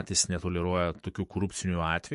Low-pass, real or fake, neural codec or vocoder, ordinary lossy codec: 14.4 kHz; fake; autoencoder, 48 kHz, 128 numbers a frame, DAC-VAE, trained on Japanese speech; MP3, 48 kbps